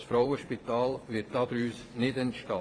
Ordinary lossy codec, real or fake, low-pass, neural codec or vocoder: AAC, 32 kbps; fake; 9.9 kHz; vocoder, 22.05 kHz, 80 mel bands, Vocos